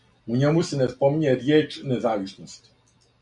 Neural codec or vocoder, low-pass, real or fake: none; 10.8 kHz; real